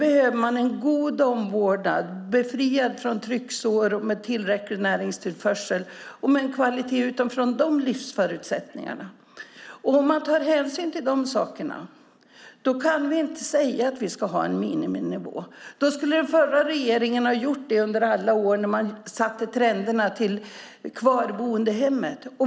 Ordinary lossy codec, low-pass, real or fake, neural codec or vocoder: none; none; real; none